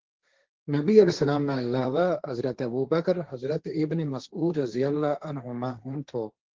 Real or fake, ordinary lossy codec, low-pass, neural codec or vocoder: fake; Opus, 16 kbps; 7.2 kHz; codec, 16 kHz, 1.1 kbps, Voila-Tokenizer